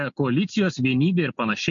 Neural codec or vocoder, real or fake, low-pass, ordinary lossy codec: none; real; 7.2 kHz; MP3, 48 kbps